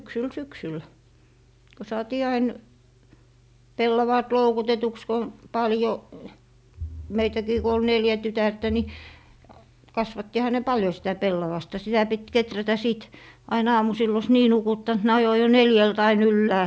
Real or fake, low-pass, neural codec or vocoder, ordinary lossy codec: real; none; none; none